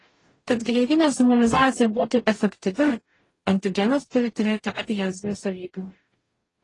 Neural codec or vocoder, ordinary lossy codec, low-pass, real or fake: codec, 44.1 kHz, 0.9 kbps, DAC; AAC, 32 kbps; 10.8 kHz; fake